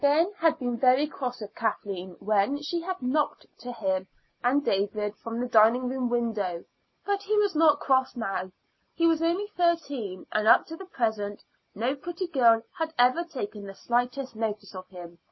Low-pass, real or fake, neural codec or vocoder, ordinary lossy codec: 7.2 kHz; real; none; MP3, 24 kbps